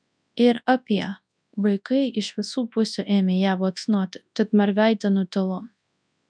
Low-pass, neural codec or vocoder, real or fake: 9.9 kHz; codec, 24 kHz, 0.9 kbps, WavTokenizer, large speech release; fake